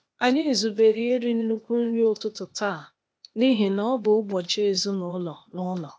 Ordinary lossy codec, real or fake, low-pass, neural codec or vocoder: none; fake; none; codec, 16 kHz, 0.8 kbps, ZipCodec